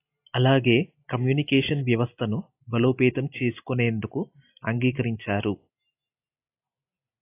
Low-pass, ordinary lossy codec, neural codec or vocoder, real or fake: 3.6 kHz; AAC, 24 kbps; none; real